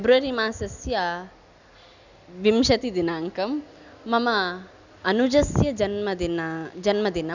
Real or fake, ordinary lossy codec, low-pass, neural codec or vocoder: real; none; 7.2 kHz; none